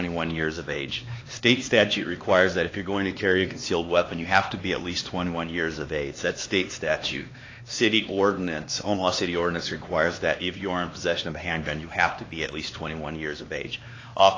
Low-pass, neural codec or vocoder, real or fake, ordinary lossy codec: 7.2 kHz; codec, 16 kHz, 2 kbps, X-Codec, HuBERT features, trained on LibriSpeech; fake; AAC, 32 kbps